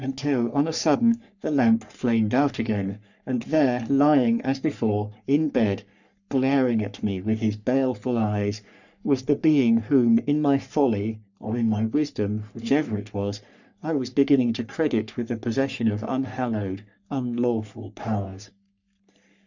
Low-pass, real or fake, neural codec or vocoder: 7.2 kHz; fake; codec, 44.1 kHz, 3.4 kbps, Pupu-Codec